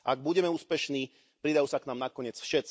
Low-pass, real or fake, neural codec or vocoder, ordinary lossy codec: none; real; none; none